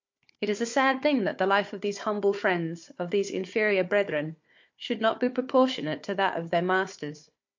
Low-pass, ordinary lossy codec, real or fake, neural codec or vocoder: 7.2 kHz; MP3, 48 kbps; fake; codec, 16 kHz, 4 kbps, FunCodec, trained on Chinese and English, 50 frames a second